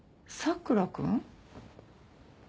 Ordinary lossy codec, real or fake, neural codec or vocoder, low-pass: none; real; none; none